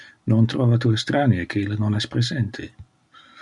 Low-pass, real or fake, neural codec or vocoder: 10.8 kHz; real; none